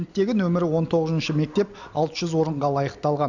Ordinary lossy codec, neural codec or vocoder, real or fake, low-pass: none; none; real; 7.2 kHz